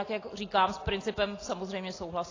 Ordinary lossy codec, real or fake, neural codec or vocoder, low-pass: AAC, 32 kbps; real; none; 7.2 kHz